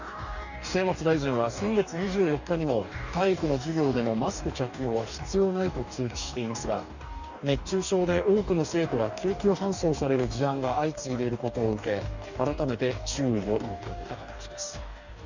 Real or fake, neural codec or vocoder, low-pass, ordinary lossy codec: fake; codec, 44.1 kHz, 2.6 kbps, DAC; 7.2 kHz; none